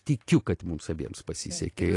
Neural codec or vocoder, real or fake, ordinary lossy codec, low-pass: vocoder, 44.1 kHz, 128 mel bands every 256 samples, BigVGAN v2; fake; AAC, 48 kbps; 10.8 kHz